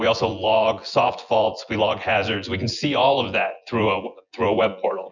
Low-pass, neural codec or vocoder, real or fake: 7.2 kHz; vocoder, 24 kHz, 100 mel bands, Vocos; fake